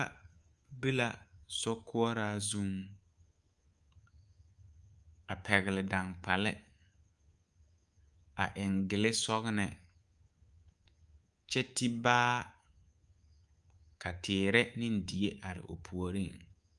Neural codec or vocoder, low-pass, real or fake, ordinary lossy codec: codec, 24 kHz, 3.1 kbps, DualCodec; 10.8 kHz; fake; Opus, 32 kbps